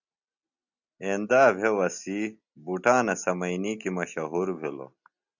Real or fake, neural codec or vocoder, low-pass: real; none; 7.2 kHz